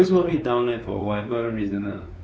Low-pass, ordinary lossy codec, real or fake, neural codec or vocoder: none; none; fake; codec, 16 kHz, 4 kbps, X-Codec, WavLM features, trained on Multilingual LibriSpeech